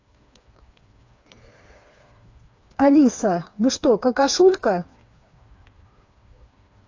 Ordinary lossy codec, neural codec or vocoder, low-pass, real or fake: AAC, 48 kbps; codec, 16 kHz, 4 kbps, FreqCodec, smaller model; 7.2 kHz; fake